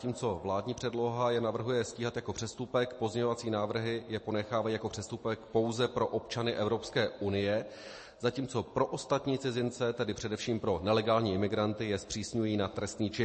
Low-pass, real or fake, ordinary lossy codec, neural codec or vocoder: 9.9 kHz; real; MP3, 32 kbps; none